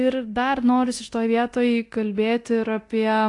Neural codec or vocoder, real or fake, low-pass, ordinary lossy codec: codec, 24 kHz, 0.9 kbps, DualCodec; fake; 10.8 kHz; AAC, 48 kbps